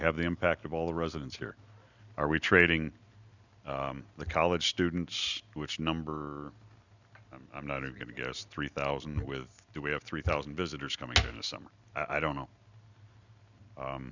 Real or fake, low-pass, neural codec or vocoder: real; 7.2 kHz; none